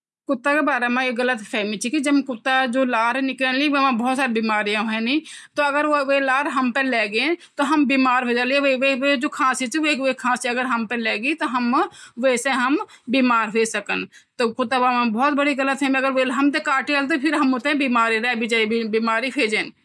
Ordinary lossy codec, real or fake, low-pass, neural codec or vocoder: none; real; none; none